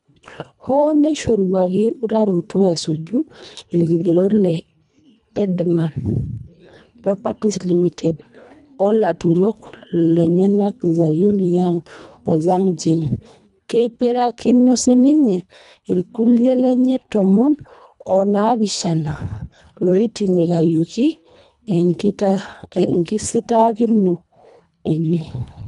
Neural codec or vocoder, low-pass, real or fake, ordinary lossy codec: codec, 24 kHz, 1.5 kbps, HILCodec; 10.8 kHz; fake; none